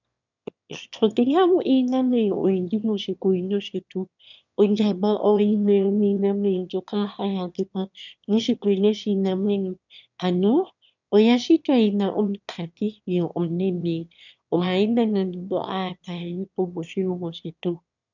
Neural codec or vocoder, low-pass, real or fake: autoencoder, 22.05 kHz, a latent of 192 numbers a frame, VITS, trained on one speaker; 7.2 kHz; fake